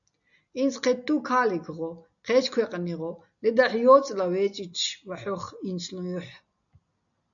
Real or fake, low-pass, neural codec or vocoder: real; 7.2 kHz; none